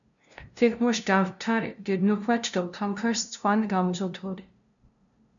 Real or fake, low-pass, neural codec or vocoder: fake; 7.2 kHz; codec, 16 kHz, 0.5 kbps, FunCodec, trained on LibriTTS, 25 frames a second